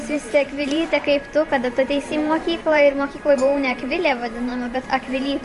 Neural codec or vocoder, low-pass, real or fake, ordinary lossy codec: vocoder, 44.1 kHz, 128 mel bands every 512 samples, BigVGAN v2; 14.4 kHz; fake; MP3, 48 kbps